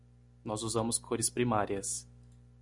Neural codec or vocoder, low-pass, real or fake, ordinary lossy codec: none; 10.8 kHz; real; MP3, 96 kbps